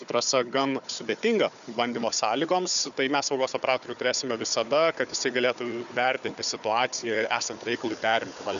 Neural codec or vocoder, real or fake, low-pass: codec, 16 kHz, 4 kbps, FunCodec, trained on Chinese and English, 50 frames a second; fake; 7.2 kHz